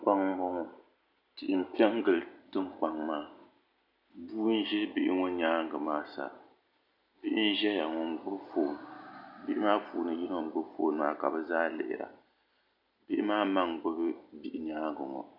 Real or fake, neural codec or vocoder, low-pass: real; none; 5.4 kHz